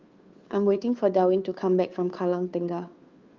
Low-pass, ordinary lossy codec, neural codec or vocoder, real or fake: 7.2 kHz; Opus, 32 kbps; codec, 16 kHz, 2 kbps, FunCodec, trained on Chinese and English, 25 frames a second; fake